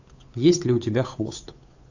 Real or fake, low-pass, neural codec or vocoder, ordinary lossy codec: fake; 7.2 kHz; codec, 16 kHz, 8 kbps, FunCodec, trained on Chinese and English, 25 frames a second; AAC, 48 kbps